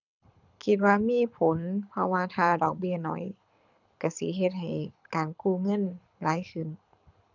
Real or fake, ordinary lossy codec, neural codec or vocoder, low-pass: fake; none; codec, 24 kHz, 6 kbps, HILCodec; 7.2 kHz